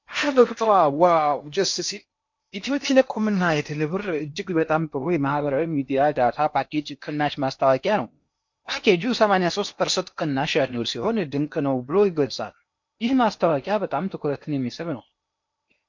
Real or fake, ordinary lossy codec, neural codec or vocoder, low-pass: fake; MP3, 48 kbps; codec, 16 kHz in and 24 kHz out, 0.8 kbps, FocalCodec, streaming, 65536 codes; 7.2 kHz